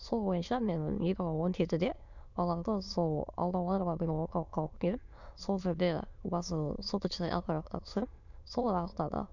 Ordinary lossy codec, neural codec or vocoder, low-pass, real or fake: none; autoencoder, 22.05 kHz, a latent of 192 numbers a frame, VITS, trained on many speakers; 7.2 kHz; fake